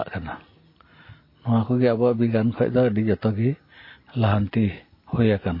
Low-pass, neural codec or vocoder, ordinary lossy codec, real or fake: 5.4 kHz; none; MP3, 24 kbps; real